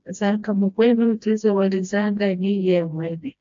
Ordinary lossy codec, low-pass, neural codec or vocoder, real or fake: AAC, 64 kbps; 7.2 kHz; codec, 16 kHz, 1 kbps, FreqCodec, smaller model; fake